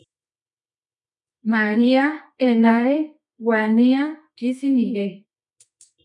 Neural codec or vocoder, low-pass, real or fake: codec, 24 kHz, 0.9 kbps, WavTokenizer, medium music audio release; 10.8 kHz; fake